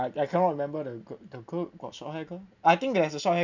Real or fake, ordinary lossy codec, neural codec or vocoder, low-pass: real; none; none; 7.2 kHz